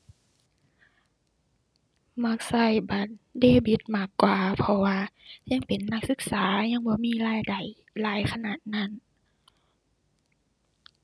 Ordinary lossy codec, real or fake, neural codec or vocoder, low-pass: none; real; none; none